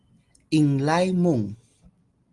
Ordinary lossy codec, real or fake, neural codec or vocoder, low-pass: Opus, 24 kbps; real; none; 10.8 kHz